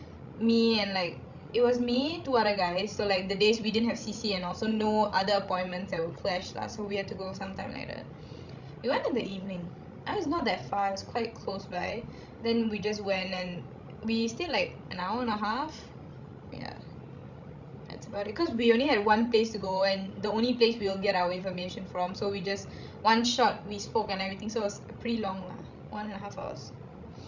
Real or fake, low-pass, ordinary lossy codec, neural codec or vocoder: fake; 7.2 kHz; none; codec, 16 kHz, 16 kbps, FreqCodec, larger model